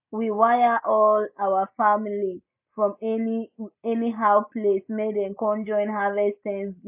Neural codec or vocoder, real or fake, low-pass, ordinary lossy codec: none; real; 3.6 kHz; none